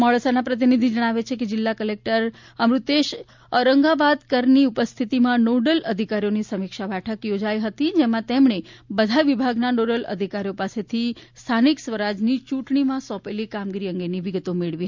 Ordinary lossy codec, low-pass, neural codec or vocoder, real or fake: MP3, 64 kbps; 7.2 kHz; none; real